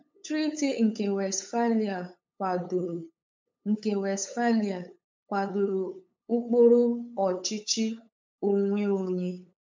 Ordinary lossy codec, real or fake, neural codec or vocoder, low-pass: MP3, 64 kbps; fake; codec, 16 kHz, 8 kbps, FunCodec, trained on LibriTTS, 25 frames a second; 7.2 kHz